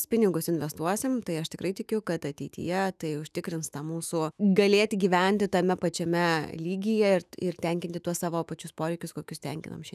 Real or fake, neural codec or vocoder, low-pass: fake; autoencoder, 48 kHz, 128 numbers a frame, DAC-VAE, trained on Japanese speech; 14.4 kHz